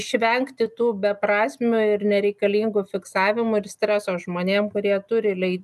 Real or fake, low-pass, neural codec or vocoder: real; 14.4 kHz; none